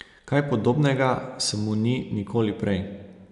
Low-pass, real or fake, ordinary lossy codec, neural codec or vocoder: 10.8 kHz; real; none; none